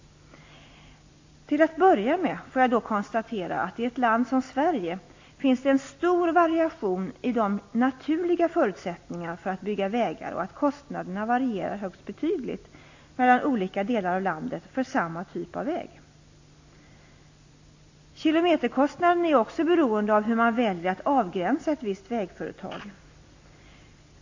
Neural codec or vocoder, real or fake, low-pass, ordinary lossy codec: none; real; 7.2 kHz; AAC, 48 kbps